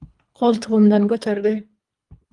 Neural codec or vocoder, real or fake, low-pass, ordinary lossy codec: codec, 24 kHz, 3 kbps, HILCodec; fake; 10.8 kHz; Opus, 32 kbps